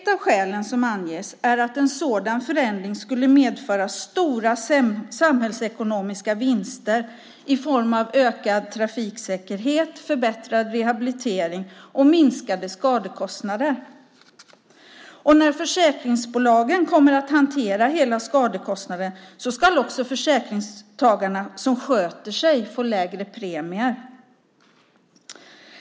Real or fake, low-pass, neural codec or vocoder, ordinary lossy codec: real; none; none; none